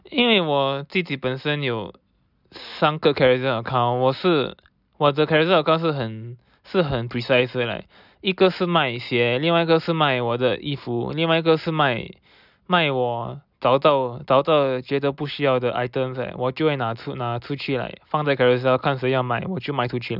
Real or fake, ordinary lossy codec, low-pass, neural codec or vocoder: real; none; 5.4 kHz; none